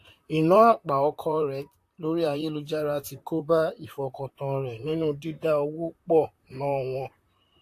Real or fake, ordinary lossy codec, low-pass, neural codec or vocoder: fake; AAC, 64 kbps; 14.4 kHz; vocoder, 44.1 kHz, 128 mel bands, Pupu-Vocoder